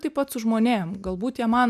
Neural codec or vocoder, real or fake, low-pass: none; real; 14.4 kHz